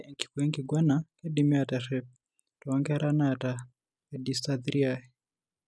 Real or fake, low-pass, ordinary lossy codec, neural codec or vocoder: real; none; none; none